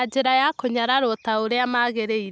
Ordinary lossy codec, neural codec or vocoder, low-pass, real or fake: none; none; none; real